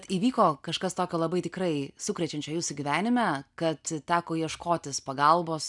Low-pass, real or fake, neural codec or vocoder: 10.8 kHz; real; none